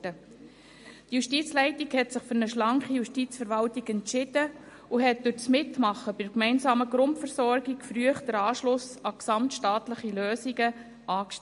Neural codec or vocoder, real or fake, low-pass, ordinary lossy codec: none; real; 10.8 kHz; MP3, 48 kbps